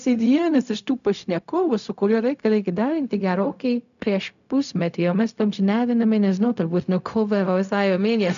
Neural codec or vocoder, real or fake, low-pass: codec, 16 kHz, 0.4 kbps, LongCat-Audio-Codec; fake; 7.2 kHz